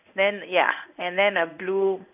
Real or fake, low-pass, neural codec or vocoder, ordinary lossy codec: fake; 3.6 kHz; codec, 16 kHz in and 24 kHz out, 1 kbps, XY-Tokenizer; none